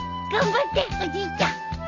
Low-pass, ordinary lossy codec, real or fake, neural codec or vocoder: 7.2 kHz; none; real; none